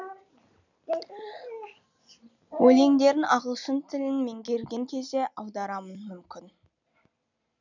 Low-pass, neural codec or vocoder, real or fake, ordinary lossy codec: 7.2 kHz; none; real; none